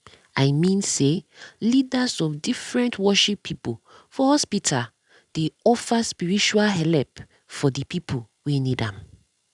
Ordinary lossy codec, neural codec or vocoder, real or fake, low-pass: none; none; real; 10.8 kHz